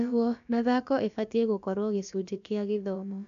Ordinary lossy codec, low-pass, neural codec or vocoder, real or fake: none; 7.2 kHz; codec, 16 kHz, about 1 kbps, DyCAST, with the encoder's durations; fake